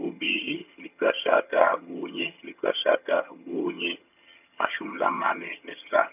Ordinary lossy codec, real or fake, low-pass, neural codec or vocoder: none; fake; 3.6 kHz; vocoder, 22.05 kHz, 80 mel bands, HiFi-GAN